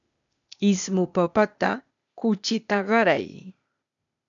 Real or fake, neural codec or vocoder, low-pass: fake; codec, 16 kHz, 0.8 kbps, ZipCodec; 7.2 kHz